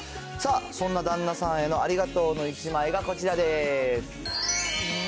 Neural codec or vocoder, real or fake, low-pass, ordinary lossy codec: none; real; none; none